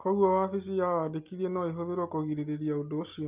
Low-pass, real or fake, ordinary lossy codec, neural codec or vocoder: 3.6 kHz; real; Opus, 32 kbps; none